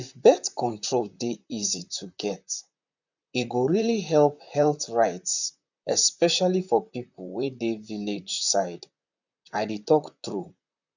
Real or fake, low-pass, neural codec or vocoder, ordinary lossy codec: fake; 7.2 kHz; vocoder, 44.1 kHz, 128 mel bands, Pupu-Vocoder; none